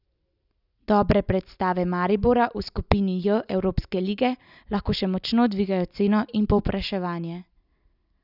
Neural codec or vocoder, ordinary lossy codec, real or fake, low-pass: none; none; real; 5.4 kHz